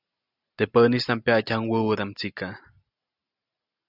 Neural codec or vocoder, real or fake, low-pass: none; real; 5.4 kHz